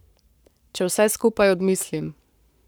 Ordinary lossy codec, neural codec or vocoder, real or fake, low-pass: none; codec, 44.1 kHz, 7.8 kbps, Pupu-Codec; fake; none